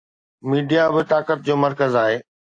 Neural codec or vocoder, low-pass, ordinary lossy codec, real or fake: none; 9.9 kHz; AAC, 48 kbps; real